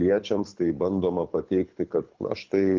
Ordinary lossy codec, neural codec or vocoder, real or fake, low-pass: Opus, 32 kbps; codec, 24 kHz, 6 kbps, HILCodec; fake; 7.2 kHz